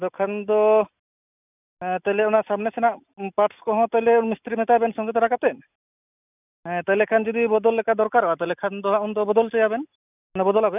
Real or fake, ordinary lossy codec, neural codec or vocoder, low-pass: real; none; none; 3.6 kHz